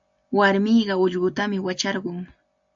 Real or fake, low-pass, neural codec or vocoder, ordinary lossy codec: real; 7.2 kHz; none; AAC, 64 kbps